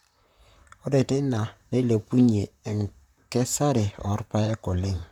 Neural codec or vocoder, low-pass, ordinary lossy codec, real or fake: vocoder, 44.1 kHz, 128 mel bands, Pupu-Vocoder; 19.8 kHz; none; fake